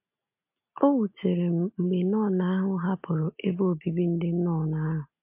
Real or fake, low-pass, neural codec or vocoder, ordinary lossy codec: real; 3.6 kHz; none; MP3, 32 kbps